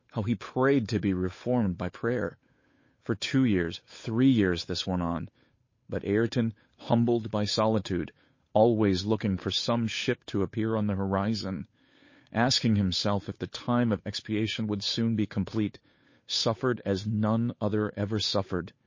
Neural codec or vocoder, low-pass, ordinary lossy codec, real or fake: codec, 16 kHz, 8 kbps, FunCodec, trained on Chinese and English, 25 frames a second; 7.2 kHz; MP3, 32 kbps; fake